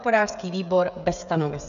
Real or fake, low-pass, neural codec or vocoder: fake; 7.2 kHz; codec, 16 kHz, 16 kbps, FreqCodec, smaller model